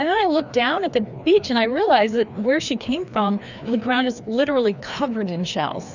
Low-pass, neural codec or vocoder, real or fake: 7.2 kHz; codec, 16 kHz, 2 kbps, FreqCodec, larger model; fake